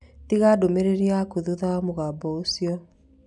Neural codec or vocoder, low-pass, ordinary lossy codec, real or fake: none; 10.8 kHz; none; real